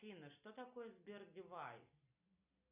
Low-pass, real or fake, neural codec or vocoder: 3.6 kHz; real; none